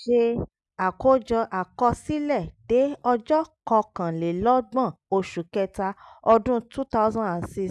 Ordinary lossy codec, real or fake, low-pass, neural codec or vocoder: none; real; none; none